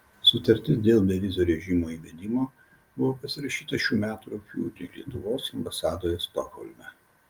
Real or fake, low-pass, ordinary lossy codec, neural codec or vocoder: real; 19.8 kHz; Opus, 32 kbps; none